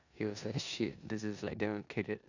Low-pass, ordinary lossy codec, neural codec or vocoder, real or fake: 7.2 kHz; none; codec, 16 kHz in and 24 kHz out, 0.9 kbps, LongCat-Audio-Codec, four codebook decoder; fake